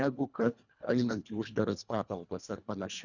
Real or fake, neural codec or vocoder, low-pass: fake; codec, 24 kHz, 1.5 kbps, HILCodec; 7.2 kHz